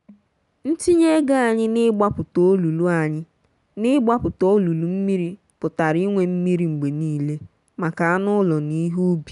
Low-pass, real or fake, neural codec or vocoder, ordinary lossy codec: 10.8 kHz; real; none; none